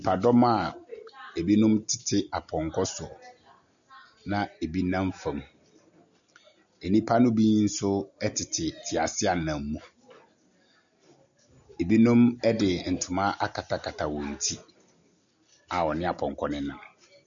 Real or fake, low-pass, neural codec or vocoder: real; 7.2 kHz; none